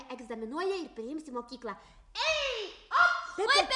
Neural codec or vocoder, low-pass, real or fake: none; 10.8 kHz; real